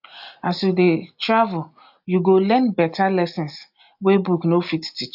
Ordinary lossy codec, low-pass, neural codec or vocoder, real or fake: AAC, 48 kbps; 5.4 kHz; none; real